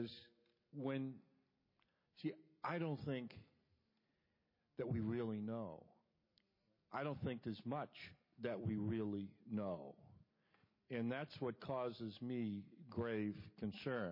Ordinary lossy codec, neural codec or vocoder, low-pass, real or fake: MP3, 24 kbps; none; 5.4 kHz; real